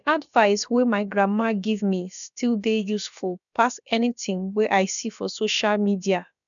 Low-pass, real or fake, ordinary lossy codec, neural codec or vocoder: 7.2 kHz; fake; none; codec, 16 kHz, about 1 kbps, DyCAST, with the encoder's durations